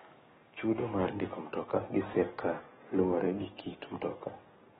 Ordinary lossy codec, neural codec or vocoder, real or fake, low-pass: AAC, 16 kbps; codec, 44.1 kHz, 7.8 kbps, DAC; fake; 19.8 kHz